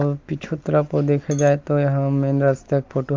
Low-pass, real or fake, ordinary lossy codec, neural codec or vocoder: 7.2 kHz; real; Opus, 24 kbps; none